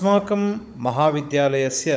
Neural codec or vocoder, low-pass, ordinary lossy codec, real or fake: codec, 16 kHz, 16 kbps, FunCodec, trained on Chinese and English, 50 frames a second; none; none; fake